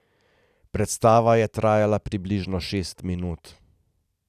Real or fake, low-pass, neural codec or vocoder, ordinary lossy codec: real; 14.4 kHz; none; none